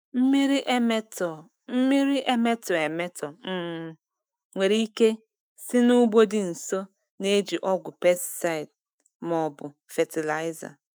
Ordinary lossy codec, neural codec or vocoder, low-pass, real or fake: none; autoencoder, 48 kHz, 128 numbers a frame, DAC-VAE, trained on Japanese speech; none; fake